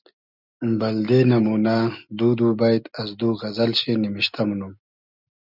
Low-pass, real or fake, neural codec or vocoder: 5.4 kHz; real; none